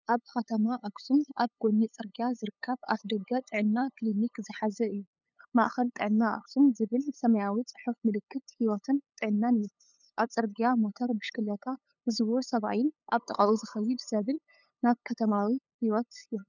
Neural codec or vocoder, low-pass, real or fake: codec, 16 kHz, 8 kbps, FunCodec, trained on LibriTTS, 25 frames a second; 7.2 kHz; fake